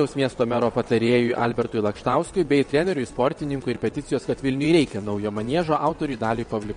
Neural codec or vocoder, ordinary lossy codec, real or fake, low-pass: vocoder, 22.05 kHz, 80 mel bands, WaveNeXt; MP3, 48 kbps; fake; 9.9 kHz